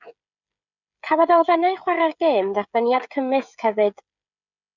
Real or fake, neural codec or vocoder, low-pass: fake; codec, 16 kHz, 16 kbps, FreqCodec, smaller model; 7.2 kHz